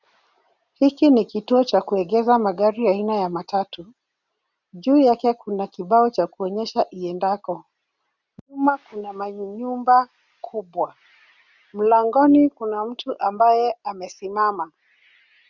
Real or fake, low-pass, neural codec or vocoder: real; 7.2 kHz; none